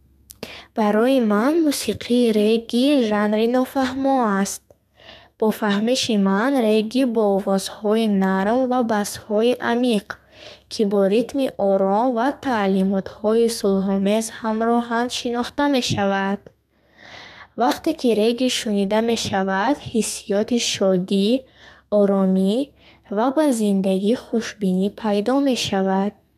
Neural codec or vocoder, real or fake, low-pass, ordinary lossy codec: codec, 32 kHz, 1.9 kbps, SNAC; fake; 14.4 kHz; none